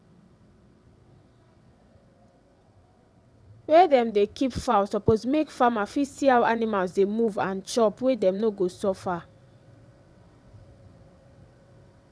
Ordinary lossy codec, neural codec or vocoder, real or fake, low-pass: none; vocoder, 22.05 kHz, 80 mel bands, WaveNeXt; fake; none